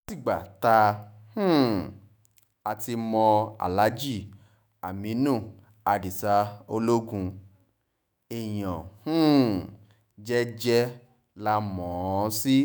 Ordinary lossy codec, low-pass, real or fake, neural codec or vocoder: none; none; fake; autoencoder, 48 kHz, 128 numbers a frame, DAC-VAE, trained on Japanese speech